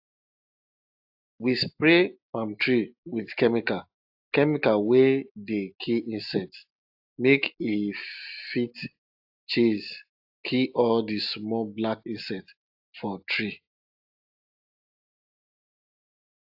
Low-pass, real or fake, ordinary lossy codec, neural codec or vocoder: 5.4 kHz; real; AAC, 48 kbps; none